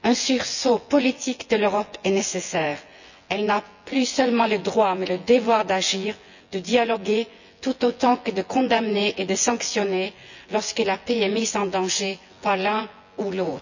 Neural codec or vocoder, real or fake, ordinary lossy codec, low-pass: vocoder, 24 kHz, 100 mel bands, Vocos; fake; none; 7.2 kHz